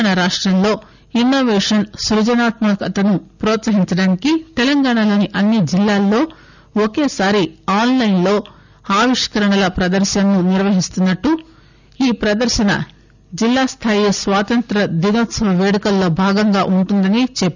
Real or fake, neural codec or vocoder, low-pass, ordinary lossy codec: real; none; 7.2 kHz; none